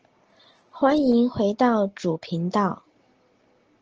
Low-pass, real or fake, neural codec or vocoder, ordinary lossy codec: 7.2 kHz; real; none; Opus, 16 kbps